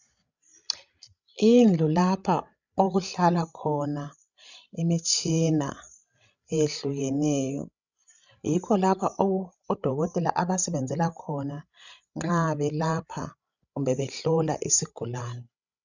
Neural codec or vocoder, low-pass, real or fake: codec, 16 kHz, 16 kbps, FreqCodec, larger model; 7.2 kHz; fake